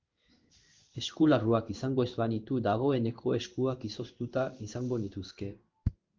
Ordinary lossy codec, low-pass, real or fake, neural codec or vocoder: Opus, 24 kbps; 7.2 kHz; fake; codec, 16 kHz in and 24 kHz out, 1 kbps, XY-Tokenizer